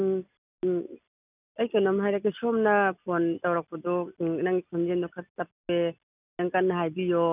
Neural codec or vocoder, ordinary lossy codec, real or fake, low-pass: none; none; real; 3.6 kHz